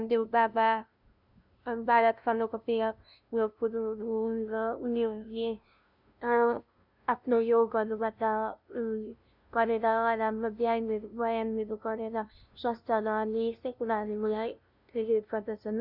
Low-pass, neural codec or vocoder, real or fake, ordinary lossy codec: 5.4 kHz; codec, 16 kHz, 0.5 kbps, FunCodec, trained on LibriTTS, 25 frames a second; fake; none